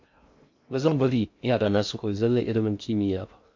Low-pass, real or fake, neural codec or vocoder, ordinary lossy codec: 7.2 kHz; fake; codec, 16 kHz in and 24 kHz out, 0.6 kbps, FocalCodec, streaming, 2048 codes; MP3, 48 kbps